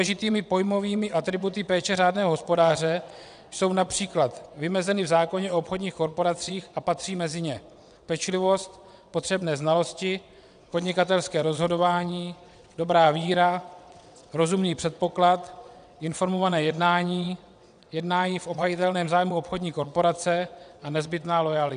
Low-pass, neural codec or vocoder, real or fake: 9.9 kHz; vocoder, 22.05 kHz, 80 mel bands, WaveNeXt; fake